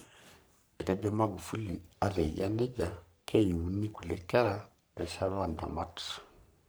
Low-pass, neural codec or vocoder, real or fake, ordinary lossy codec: none; codec, 44.1 kHz, 3.4 kbps, Pupu-Codec; fake; none